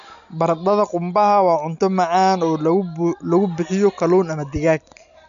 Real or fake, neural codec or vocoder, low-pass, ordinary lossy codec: real; none; 7.2 kHz; none